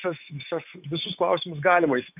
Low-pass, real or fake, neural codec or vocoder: 3.6 kHz; fake; autoencoder, 48 kHz, 128 numbers a frame, DAC-VAE, trained on Japanese speech